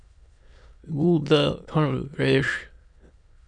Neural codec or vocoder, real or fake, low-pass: autoencoder, 22.05 kHz, a latent of 192 numbers a frame, VITS, trained on many speakers; fake; 9.9 kHz